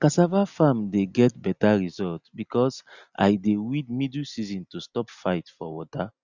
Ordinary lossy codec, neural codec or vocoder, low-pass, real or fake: Opus, 64 kbps; none; 7.2 kHz; real